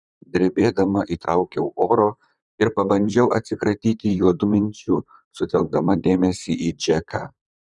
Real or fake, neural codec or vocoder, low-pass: fake; vocoder, 44.1 kHz, 128 mel bands, Pupu-Vocoder; 10.8 kHz